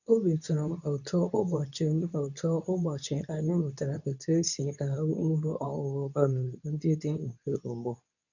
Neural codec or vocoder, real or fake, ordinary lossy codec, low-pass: codec, 24 kHz, 0.9 kbps, WavTokenizer, medium speech release version 2; fake; none; 7.2 kHz